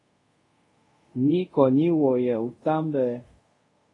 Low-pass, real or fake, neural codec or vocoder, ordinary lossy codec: 10.8 kHz; fake; codec, 24 kHz, 0.5 kbps, DualCodec; AAC, 32 kbps